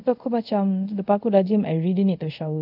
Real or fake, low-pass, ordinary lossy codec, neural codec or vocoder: fake; 5.4 kHz; none; codec, 24 kHz, 0.5 kbps, DualCodec